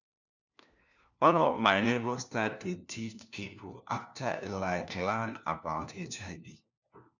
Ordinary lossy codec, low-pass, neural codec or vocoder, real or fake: none; 7.2 kHz; codec, 16 kHz, 1 kbps, FunCodec, trained on LibriTTS, 50 frames a second; fake